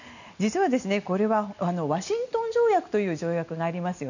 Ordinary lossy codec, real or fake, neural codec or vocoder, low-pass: none; real; none; 7.2 kHz